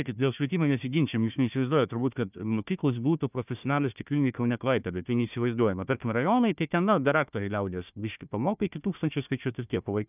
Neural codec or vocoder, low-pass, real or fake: codec, 16 kHz, 1 kbps, FunCodec, trained on Chinese and English, 50 frames a second; 3.6 kHz; fake